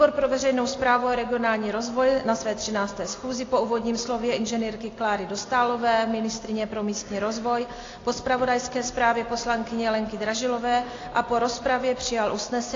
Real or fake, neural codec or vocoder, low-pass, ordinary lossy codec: real; none; 7.2 kHz; AAC, 32 kbps